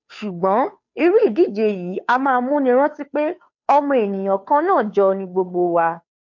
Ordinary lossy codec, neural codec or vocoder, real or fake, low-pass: MP3, 48 kbps; codec, 16 kHz, 2 kbps, FunCodec, trained on Chinese and English, 25 frames a second; fake; 7.2 kHz